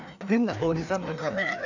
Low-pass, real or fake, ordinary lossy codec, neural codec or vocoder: 7.2 kHz; fake; none; codec, 16 kHz, 2 kbps, FreqCodec, larger model